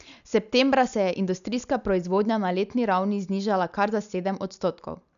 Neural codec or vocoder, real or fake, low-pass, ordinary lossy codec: none; real; 7.2 kHz; none